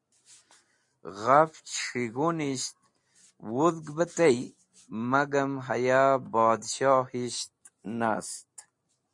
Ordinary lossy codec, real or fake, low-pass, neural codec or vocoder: MP3, 48 kbps; real; 10.8 kHz; none